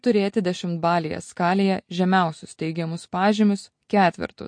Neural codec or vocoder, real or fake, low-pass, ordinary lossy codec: none; real; 9.9 kHz; MP3, 48 kbps